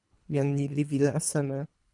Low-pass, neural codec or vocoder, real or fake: 10.8 kHz; codec, 24 kHz, 3 kbps, HILCodec; fake